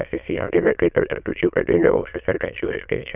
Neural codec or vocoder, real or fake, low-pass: autoencoder, 22.05 kHz, a latent of 192 numbers a frame, VITS, trained on many speakers; fake; 3.6 kHz